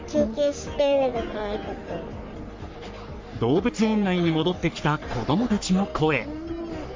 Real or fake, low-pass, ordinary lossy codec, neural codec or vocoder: fake; 7.2 kHz; MP3, 48 kbps; codec, 44.1 kHz, 3.4 kbps, Pupu-Codec